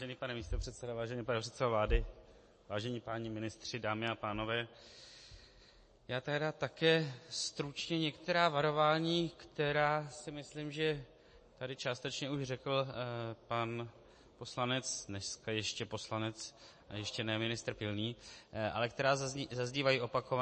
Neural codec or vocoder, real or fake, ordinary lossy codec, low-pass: none; real; MP3, 32 kbps; 9.9 kHz